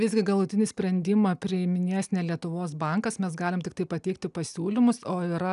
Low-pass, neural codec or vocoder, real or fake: 10.8 kHz; none; real